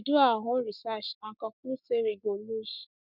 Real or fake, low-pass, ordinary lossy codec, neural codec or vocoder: fake; 5.4 kHz; none; codec, 44.1 kHz, 7.8 kbps, Pupu-Codec